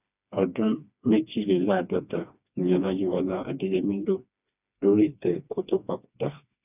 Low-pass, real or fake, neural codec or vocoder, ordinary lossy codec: 3.6 kHz; fake; codec, 16 kHz, 2 kbps, FreqCodec, smaller model; none